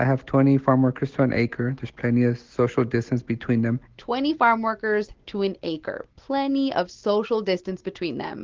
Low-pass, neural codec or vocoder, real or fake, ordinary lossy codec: 7.2 kHz; none; real; Opus, 16 kbps